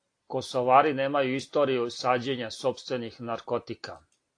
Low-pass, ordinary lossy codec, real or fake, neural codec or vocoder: 9.9 kHz; AAC, 48 kbps; real; none